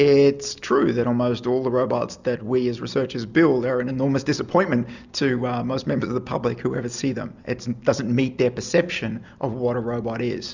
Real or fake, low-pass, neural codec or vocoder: real; 7.2 kHz; none